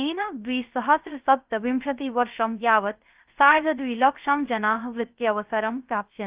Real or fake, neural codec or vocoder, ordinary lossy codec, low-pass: fake; codec, 16 kHz, 0.3 kbps, FocalCodec; Opus, 32 kbps; 3.6 kHz